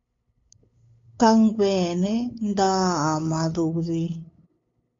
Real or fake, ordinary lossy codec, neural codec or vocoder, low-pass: fake; AAC, 32 kbps; codec, 16 kHz, 8 kbps, FunCodec, trained on LibriTTS, 25 frames a second; 7.2 kHz